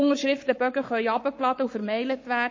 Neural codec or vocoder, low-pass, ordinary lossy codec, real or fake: codec, 44.1 kHz, 7.8 kbps, Pupu-Codec; 7.2 kHz; MP3, 32 kbps; fake